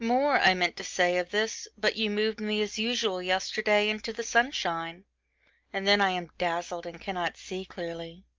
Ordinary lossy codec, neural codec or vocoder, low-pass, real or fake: Opus, 24 kbps; none; 7.2 kHz; real